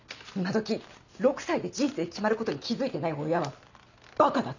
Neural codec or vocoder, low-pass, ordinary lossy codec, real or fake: none; 7.2 kHz; none; real